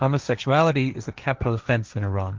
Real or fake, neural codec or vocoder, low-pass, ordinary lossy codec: fake; codec, 16 kHz, 1.1 kbps, Voila-Tokenizer; 7.2 kHz; Opus, 24 kbps